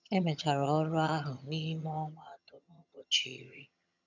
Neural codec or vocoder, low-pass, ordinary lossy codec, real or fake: vocoder, 22.05 kHz, 80 mel bands, HiFi-GAN; 7.2 kHz; none; fake